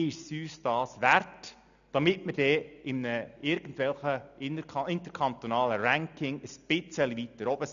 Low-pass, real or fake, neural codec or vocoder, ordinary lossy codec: 7.2 kHz; real; none; none